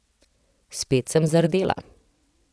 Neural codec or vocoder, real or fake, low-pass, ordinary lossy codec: vocoder, 22.05 kHz, 80 mel bands, WaveNeXt; fake; none; none